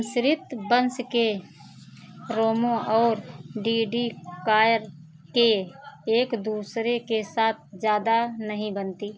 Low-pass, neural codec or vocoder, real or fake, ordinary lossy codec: none; none; real; none